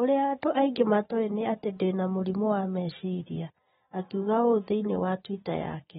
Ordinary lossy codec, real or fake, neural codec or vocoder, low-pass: AAC, 16 kbps; real; none; 19.8 kHz